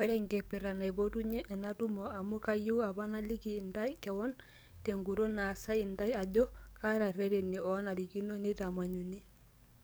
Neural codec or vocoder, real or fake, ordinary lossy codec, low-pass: codec, 44.1 kHz, 7.8 kbps, DAC; fake; none; none